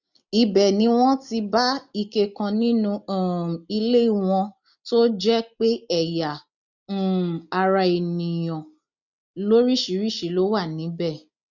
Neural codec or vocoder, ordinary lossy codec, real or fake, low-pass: none; none; real; 7.2 kHz